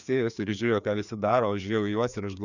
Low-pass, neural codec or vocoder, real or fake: 7.2 kHz; codec, 16 kHz, 2 kbps, FreqCodec, larger model; fake